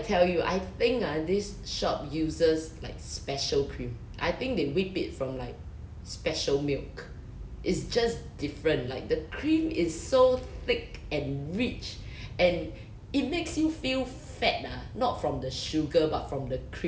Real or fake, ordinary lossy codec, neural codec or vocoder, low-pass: real; none; none; none